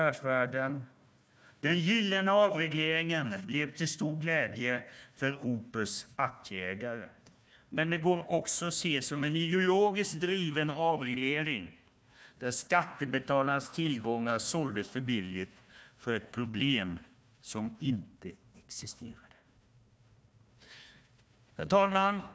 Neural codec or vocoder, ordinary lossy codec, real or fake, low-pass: codec, 16 kHz, 1 kbps, FunCodec, trained on Chinese and English, 50 frames a second; none; fake; none